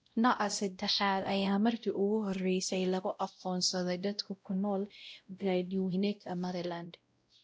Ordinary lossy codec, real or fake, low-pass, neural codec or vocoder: none; fake; none; codec, 16 kHz, 0.5 kbps, X-Codec, WavLM features, trained on Multilingual LibriSpeech